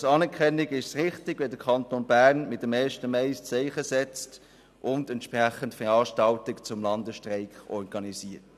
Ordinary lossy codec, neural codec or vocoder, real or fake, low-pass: none; none; real; 14.4 kHz